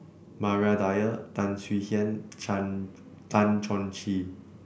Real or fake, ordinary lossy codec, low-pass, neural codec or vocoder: real; none; none; none